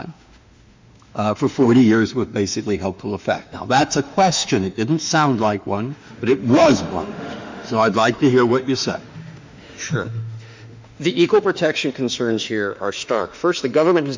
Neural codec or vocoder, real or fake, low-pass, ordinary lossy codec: autoencoder, 48 kHz, 32 numbers a frame, DAC-VAE, trained on Japanese speech; fake; 7.2 kHz; MP3, 64 kbps